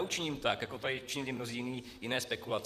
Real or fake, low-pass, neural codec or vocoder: fake; 14.4 kHz; vocoder, 44.1 kHz, 128 mel bands, Pupu-Vocoder